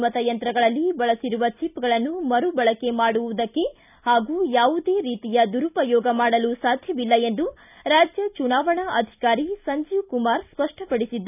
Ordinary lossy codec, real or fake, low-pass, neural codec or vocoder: none; real; 3.6 kHz; none